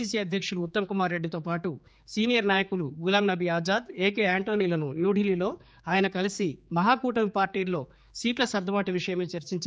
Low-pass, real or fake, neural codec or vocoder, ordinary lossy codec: none; fake; codec, 16 kHz, 4 kbps, X-Codec, HuBERT features, trained on general audio; none